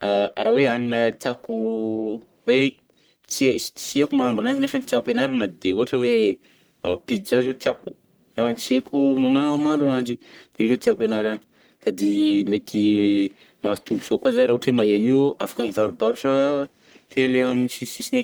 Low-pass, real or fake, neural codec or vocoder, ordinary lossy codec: none; fake; codec, 44.1 kHz, 1.7 kbps, Pupu-Codec; none